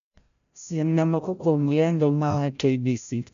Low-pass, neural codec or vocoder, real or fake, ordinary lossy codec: 7.2 kHz; codec, 16 kHz, 0.5 kbps, FreqCodec, larger model; fake; none